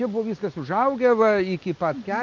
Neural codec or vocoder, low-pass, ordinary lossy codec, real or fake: none; 7.2 kHz; Opus, 24 kbps; real